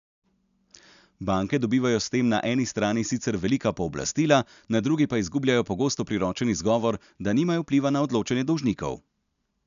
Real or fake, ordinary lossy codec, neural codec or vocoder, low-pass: real; none; none; 7.2 kHz